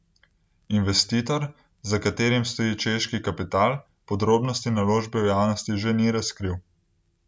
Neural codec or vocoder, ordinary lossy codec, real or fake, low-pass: none; none; real; none